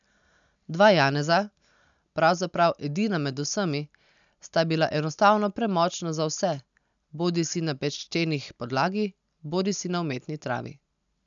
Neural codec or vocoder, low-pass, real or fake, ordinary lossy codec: none; 7.2 kHz; real; none